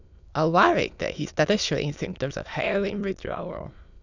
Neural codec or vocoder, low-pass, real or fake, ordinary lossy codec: autoencoder, 22.05 kHz, a latent of 192 numbers a frame, VITS, trained on many speakers; 7.2 kHz; fake; none